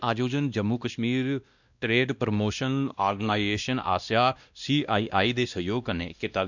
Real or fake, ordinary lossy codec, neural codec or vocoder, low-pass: fake; none; codec, 16 kHz, 1 kbps, X-Codec, WavLM features, trained on Multilingual LibriSpeech; 7.2 kHz